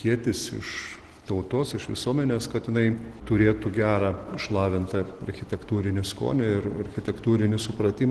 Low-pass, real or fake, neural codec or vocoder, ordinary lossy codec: 10.8 kHz; real; none; Opus, 16 kbps